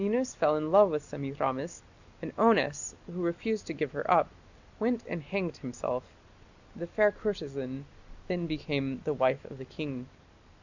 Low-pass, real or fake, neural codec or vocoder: 7.2 kHz; real; none